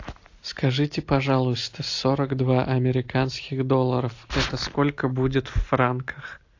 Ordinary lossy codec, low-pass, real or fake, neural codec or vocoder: AAC, 48 kbps; 7.2 kHz; real; none